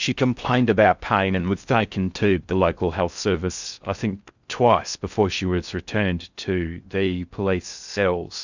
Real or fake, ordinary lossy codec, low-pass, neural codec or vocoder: fake; Opus, 64 kbps; 7.2 kHz; codec, 16 kHz in and 24 kHz out, 0.6 kbps, FocalCodec, streaming, 4096 codes